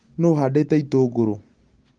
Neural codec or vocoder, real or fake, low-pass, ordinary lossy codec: none; real; 9.9 kHz; Opus, 24 kbps